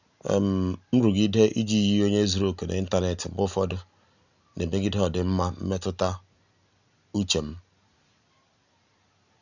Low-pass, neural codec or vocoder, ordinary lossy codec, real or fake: 7.2 kHz; none; none; real